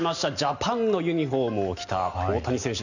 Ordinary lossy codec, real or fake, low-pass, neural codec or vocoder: none; real; 7.2 kHz; none